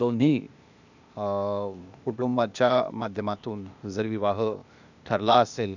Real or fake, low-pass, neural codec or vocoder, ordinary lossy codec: fake; 7.2 kHz; codec, 16 kHz, 0.8 kbps, ZipCodec; none